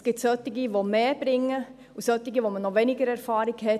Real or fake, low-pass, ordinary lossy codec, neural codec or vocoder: real; 14.4 kHz; none; none